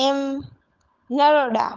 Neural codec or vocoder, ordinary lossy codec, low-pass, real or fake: codec, 16 kHz, 16 kbps, FunCodec, trained on LibriTTS, 50 frames a second; Opus, 32 kbps; 7.2 kHz; fake